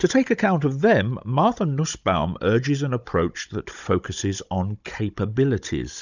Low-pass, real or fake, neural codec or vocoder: 7.2 kHz; fake; codec, 16 kHz, 16 kbps, FunCodec, trained on Chinese and English, 50 frames a second